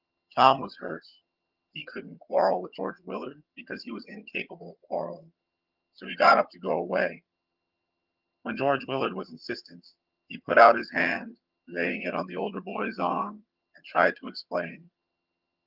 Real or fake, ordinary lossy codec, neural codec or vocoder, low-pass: fake; Opus, 32 kbps; vocoder, 22.05 kHz, 80 mel bands, HiFi-GAN; 5.4 kHz